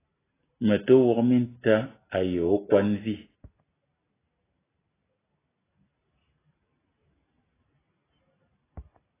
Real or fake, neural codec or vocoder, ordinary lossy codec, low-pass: real; none; AAC, 16 kbps; 3.6 kHz